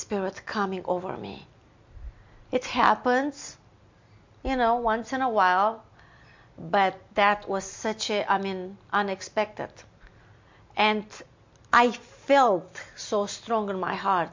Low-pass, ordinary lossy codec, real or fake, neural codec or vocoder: 7.2 kHz; MP3, 48 kbps; real; none